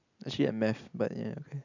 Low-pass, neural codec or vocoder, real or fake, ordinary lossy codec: 7.2 kHz; none; real; none